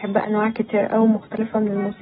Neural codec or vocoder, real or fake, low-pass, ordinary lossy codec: none; real; 10.8 kHz; AAC, 16 kbps